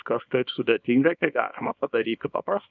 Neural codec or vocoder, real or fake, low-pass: codec, 24 kHz, 0.9 kbps, WavTokenizer, small release; fake; 7.2 kHz